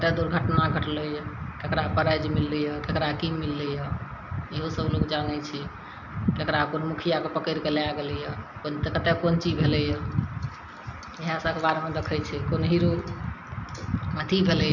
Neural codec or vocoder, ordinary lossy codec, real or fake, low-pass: none; none; real; 7.2 kHz